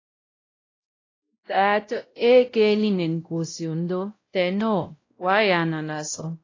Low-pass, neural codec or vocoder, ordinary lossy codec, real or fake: 7.2 kHz; codec, 16 kHz, 0.5 kbps, X-Codec, WavLM features, trained on Multilingual LibriSpeech; AAC, 32 kbps; fake